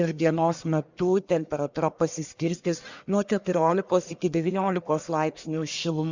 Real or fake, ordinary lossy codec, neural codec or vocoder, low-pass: fake; Opus, 64 kbps; codec, 44.1 kHz, 1.7 kbps, Pupu-Codec; 7.2 kHz